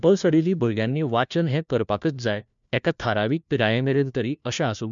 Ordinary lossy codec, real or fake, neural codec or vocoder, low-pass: MP3, 96 kbps; fake; codec, 16 kHz, 1 kbps, FunCodec, trained on LibriTTS, 50 frames a second; 7.2 kHz